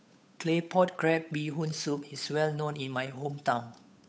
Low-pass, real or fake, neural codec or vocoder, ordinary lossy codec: none; fake; codec, 16 kHz, 8 kbps, FunCodec, trained on Chinese and English, 25 frames a second; none